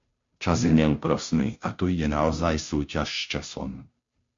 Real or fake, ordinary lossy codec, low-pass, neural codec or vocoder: fake; MP3, 48 kbps; 7.2 kHz; codec, 16 kHz, 0.5 kbps, FunCodec, trained on Chinese and English, 25 frames a second